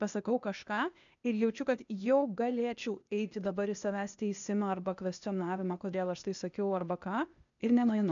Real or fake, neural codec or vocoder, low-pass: fake; codec, 16 kHz, 0.8 kbps, ZipCodec; 7.2 kHz